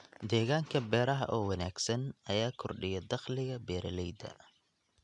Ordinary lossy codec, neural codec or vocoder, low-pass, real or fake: MP3, 96 kbps; none; 10.8 kHz; real